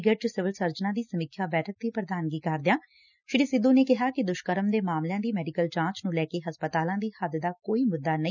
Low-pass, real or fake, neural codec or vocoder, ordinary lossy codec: 7.2 kHz; real; none; none